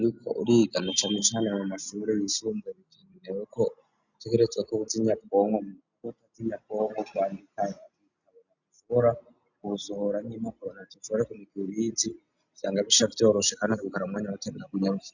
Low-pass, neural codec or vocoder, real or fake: 7.2 kHz; none; real